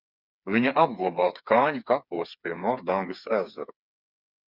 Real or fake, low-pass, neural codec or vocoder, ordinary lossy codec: fake; 5.4 kHz; codec, 16 kHz, 4 kbps, FreqCodec, smaller model; Opus, 64 kbps